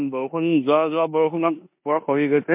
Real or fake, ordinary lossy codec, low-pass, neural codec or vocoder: fake; MP3, 32 kbps; 3.6 kHz; codec, 16 kHz in and 24 kHz out, 0.9 kbps, LongCat-Audio-Codec, four codebook decoder